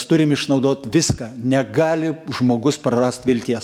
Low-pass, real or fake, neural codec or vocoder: 19.8 kHz; fake; codec, 44.1 kHz, 7.8 kbps, DAC